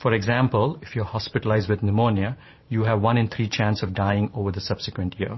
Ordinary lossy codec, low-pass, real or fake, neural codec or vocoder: MP3, 24 kbps; 7.2 kHz; fake; vocoder, 44.1 kHz, 128 mel bands every 512 samples, BigVGAN v2